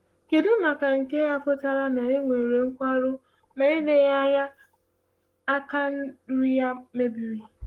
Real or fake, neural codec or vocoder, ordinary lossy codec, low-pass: fake; codec, 44.1 kHz, 7.8 kbps, Pupu-Codec; Opus, 24 kbps; 19.8 kHz